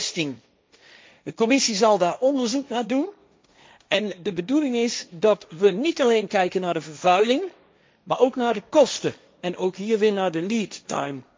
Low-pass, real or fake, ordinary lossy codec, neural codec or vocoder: none; fake; none; codec, 16 kHz, 1.1 kbps, Voila-Tokenizer